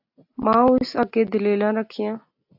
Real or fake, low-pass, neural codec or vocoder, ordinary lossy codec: real; 5.4 kHz; none; AAC, 48 kbps